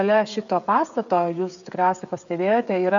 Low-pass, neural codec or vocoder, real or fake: 7.2 kHz; codec, 16 kHz, 16 kbps, FreqCodec, smaller model; fake